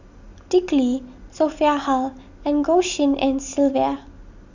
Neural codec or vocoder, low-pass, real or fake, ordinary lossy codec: none; 7.2 kHz; real; none